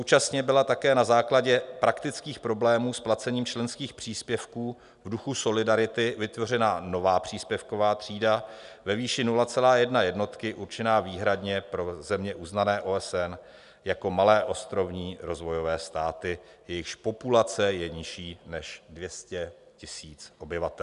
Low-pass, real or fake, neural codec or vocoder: 10.8 kHz; real; none